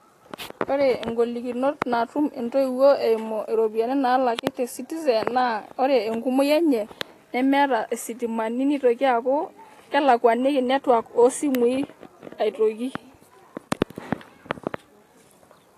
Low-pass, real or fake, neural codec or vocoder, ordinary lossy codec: 14.4 kHz; real; none; AAC, 48 kbps